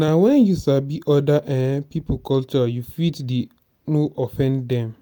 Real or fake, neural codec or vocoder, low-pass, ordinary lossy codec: real; none; none; none